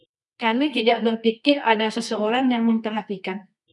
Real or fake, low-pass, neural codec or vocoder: fake; 10.8 kHz; codec, 24 kHz, 0.9 kbps, WavTokenizer, medium music audio release